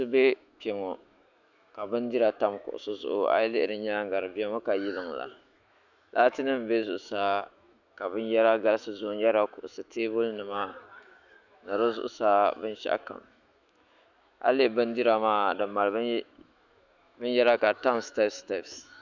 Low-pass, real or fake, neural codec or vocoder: 7.2 kHz; fake; autoencoder, 48 kHz, 128 numbers a frame, DAC-VAE, trained on Japanese speech